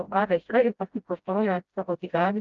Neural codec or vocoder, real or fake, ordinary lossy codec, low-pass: codec, 16 kHz, 0.5 kbps, FreqCodec, smaller model; fake; Opus, 32 kbps; 7.2 kHz